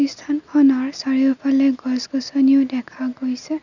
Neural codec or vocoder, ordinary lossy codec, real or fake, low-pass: none; none; real; 7.2 kHz